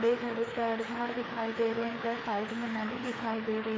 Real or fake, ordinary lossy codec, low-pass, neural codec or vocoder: fake; none; none; codec, 16 kHz, 4 kbps, FreqCodec, larger model